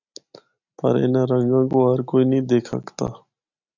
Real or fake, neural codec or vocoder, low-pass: real; none; 7.2 kHz